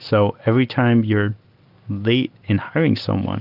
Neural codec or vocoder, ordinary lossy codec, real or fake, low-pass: none; Opus, 32 kbps; real; 5.4 kHz